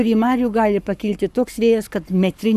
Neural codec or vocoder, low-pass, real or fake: codec, 44.1 kHz, 7.8 kbps, Pupu-Codec; 14.4 kHz; fake